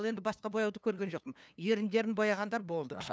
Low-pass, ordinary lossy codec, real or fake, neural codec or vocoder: none; none; fake; codec, 16 kHz, 2 kbps, FunCodec, trained on LibriTTS, 25 frames a second